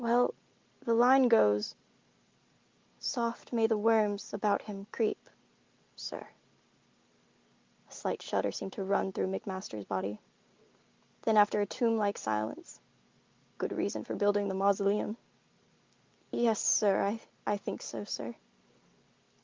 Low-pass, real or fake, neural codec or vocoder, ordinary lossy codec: 7.2 kHz; real; none; Opus, 32 kbps